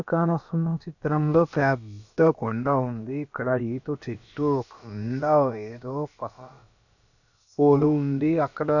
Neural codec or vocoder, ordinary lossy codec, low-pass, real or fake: codec, 16 kHz, about 1 kbps, DyCAST, with the encoder's durations; none; 7.2 kHz; fake